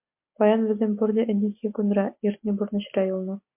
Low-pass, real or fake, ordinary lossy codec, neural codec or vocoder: 3.6 kHz; real; MP3, 32 kbps; none